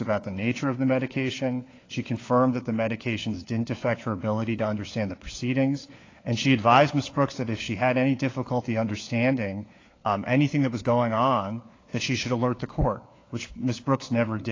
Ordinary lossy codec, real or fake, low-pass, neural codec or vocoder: AAC, 32 kbps; fake; 7.2 kHz; codec, 16 kHz, 4 kbps, FunCodec, trained on LibriTTS, 50 frames a second